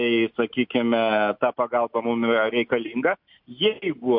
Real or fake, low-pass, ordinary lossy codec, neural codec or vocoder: real; 5.4 kHz; MP3, 48 kbps; none